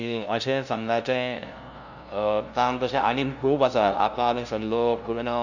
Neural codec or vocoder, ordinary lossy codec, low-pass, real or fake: codec, 16 kHz, 0.5 kbps, FunCodec, trained on LibriTTS, 25 frames a second; none; 7.2 kHz; fake